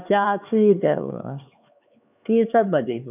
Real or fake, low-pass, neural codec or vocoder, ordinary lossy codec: fake; 3.6 kHz; codec, 16 kHz, 4 kbps, X-Codec, HuBERT features, trained on LibriSpeech; none